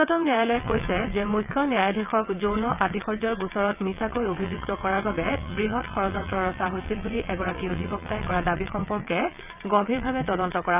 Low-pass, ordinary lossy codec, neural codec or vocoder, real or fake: 3.6 kHz; none; vocoder, 22.05 kHz, 80 mel bands, WaveNeXt; fake